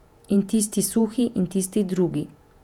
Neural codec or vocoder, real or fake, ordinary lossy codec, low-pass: vocoder, 48 kHz, 128 mel bands, Vocos; fake; none; 19.8 kHz